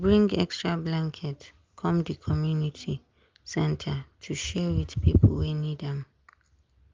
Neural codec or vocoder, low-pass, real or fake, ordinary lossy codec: none; 7.2 kHz; real; Opus, 32 kbps